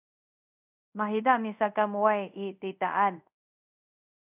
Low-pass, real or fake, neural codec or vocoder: 3.6 kHz; fake; codec, 24 kHz, 0.5 kbps, DualCodec